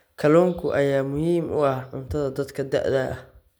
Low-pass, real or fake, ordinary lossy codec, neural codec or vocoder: none; real; none; none